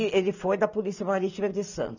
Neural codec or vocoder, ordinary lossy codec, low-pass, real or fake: none; none; 7.2 kHz; real